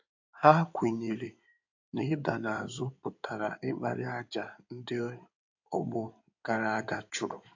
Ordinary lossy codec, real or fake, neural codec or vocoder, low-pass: AAC, 48 kbps; fake; codec, 16 kHz in and 24 kHz out, 2.2 kbps, FireRedTTS-2 codec; 7.2 kHz